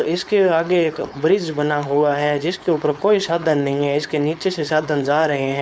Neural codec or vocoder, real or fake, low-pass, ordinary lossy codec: codec, 16 kHz, 4.8 kbps, FACodec; fake; none; none